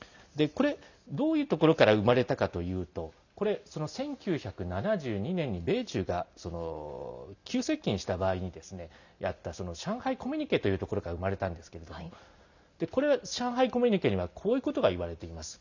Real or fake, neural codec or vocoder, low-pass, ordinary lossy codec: real; none; 7.2 kHz; none